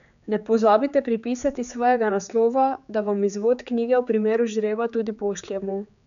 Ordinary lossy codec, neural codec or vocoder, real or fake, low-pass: none; codec, 16 kHz, 4 kbps, X-Codec, HuBERT features, trained on general audio; fake; 7.2 kHz